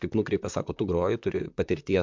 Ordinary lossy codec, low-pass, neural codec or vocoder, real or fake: MP3, 64 kbps; 7.2 kHz; vocoder, 44.1 kHz, 128 mel bands, Pupu-Vocoder; fake